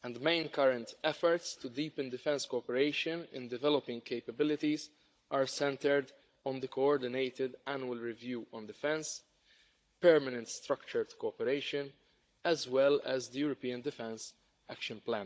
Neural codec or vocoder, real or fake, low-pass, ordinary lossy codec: codec, 16 kHz, 16 kbps, FunCodec, trained on Chinese and English, 50 frames a second; fake; none; none